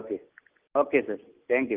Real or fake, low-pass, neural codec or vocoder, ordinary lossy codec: real; 3.6 kHz; none; Opus, 32 kbps